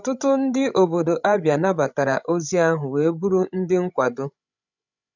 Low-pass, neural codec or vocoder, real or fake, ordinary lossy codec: 7.2 kHz; codec, 16 kHz, 16 kbps, FreqCodec, larger model; fake; none